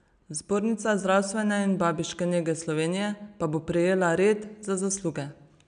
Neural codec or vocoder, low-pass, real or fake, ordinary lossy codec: none; 9.9 kHz; real; none